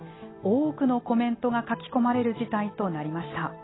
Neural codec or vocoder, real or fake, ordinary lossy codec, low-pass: none; real; AAC, 16 kbps; 7.2 kHz